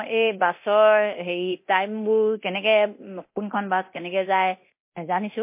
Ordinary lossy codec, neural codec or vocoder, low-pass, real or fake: MP3, 24 kbps; codec, 24 kHz, 0.9 kbps, DualCodec; 3.6 kHz; fake